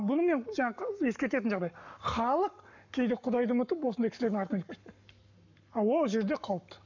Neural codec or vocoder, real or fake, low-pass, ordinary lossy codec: codec, 44.1 kHz, 7.8 kbps, Pupu-Codec; fake; 7.2 kHz; none